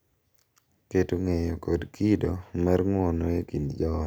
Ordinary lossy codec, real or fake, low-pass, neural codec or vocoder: none; real; none; none